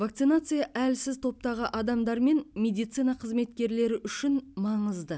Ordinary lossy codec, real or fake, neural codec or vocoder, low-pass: none; real; none; none